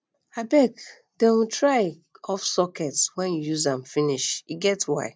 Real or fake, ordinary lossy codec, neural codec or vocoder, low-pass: real; none; none; none